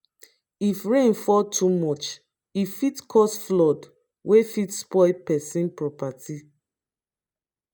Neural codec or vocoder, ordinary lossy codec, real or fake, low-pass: none; none; real; none